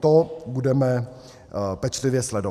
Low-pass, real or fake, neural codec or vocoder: 14.4 kHz; real; none